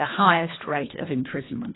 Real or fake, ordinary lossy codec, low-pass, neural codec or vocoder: fake; AAC, 16 kbps; 7.2 kHz; codec, 24 kHz, 1.5 kbps, HILCodec